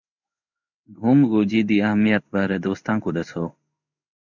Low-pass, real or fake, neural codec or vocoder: 7.2 kHz; fake; codec, 16 kHz in and 24 kHz out, 1 kbps, XY-Tokenizer